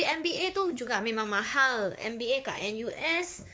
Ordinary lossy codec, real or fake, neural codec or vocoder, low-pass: none; fake; codec, 16 kHz, 4 kbps, X-Codec, WavLM features, trained on Multilingual LibriSpeech; none